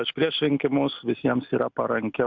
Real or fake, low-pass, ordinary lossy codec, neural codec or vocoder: real; 7.2 kHz; MP3, 64 kbps; none